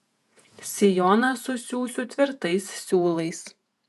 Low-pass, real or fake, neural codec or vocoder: 14.4 kHz; fake; vocoder, 48 kHz, 128 mel bands, Vocos